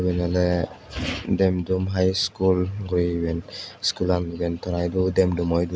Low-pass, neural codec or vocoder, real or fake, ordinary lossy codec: none; none; real; none